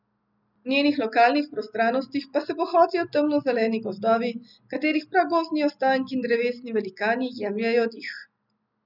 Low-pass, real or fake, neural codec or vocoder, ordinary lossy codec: 5.4 kHz; real; none; none